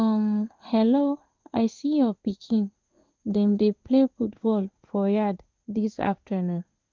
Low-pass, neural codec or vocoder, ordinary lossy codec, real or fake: 7.2 kHz; codec, 16 kHz in and 24 kHz out, 1 kbps, XY-Tokenizer; Opus, 24 kbps; fake